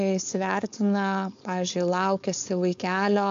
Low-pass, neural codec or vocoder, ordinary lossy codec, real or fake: 7.2 kHz; codec, 16 kHz, 4.8 kbps, FACodec; AAC, 64 kbps; fake